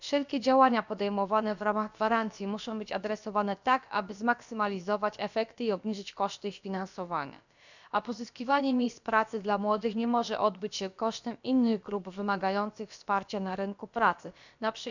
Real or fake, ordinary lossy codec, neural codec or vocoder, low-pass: fake; none; codec, 16 kHz, about 1 kbps, DyCAST, with the encoder's durations; 7.2 kHz